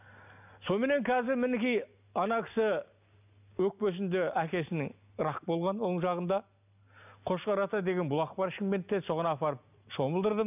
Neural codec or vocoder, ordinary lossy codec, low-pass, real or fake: none; none; 3.6 kHz; real